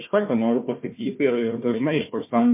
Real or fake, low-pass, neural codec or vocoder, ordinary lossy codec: fake; 3.6 kHz; codec, 16 kHz, 1 kbps, FunCodec, trained on Chinese and English, 50 frames a second; MP3, 24 kbps